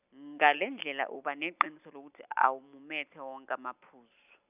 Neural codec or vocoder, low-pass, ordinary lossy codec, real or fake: none; 3.6 kHz; none; real